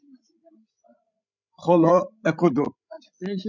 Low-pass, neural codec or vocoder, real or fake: 7.2 kHz; codec, 16 kHz, 16 kbps, FreqCodec, larger model; fake